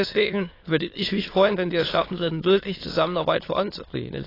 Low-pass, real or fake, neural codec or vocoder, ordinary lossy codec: 5.4 kHz; fake; autoencoder, 22.05 kHz, a latent of 192 numbers a frame, VITS, trained on many speakers; AAC, 24 kbps